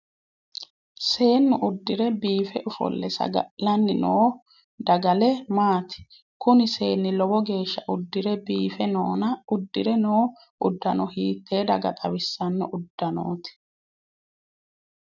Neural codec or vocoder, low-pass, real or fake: none; 7.2 kHz; real